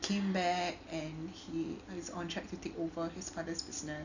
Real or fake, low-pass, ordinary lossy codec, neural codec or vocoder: real; 7.2 kHz; none; none